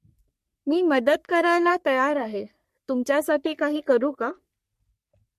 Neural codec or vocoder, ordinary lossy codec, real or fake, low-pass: codec, 44.1 kHz, 3.4 kbps, Pupu-Codec; MP3, 64 kbps; fake; 14.4 kHz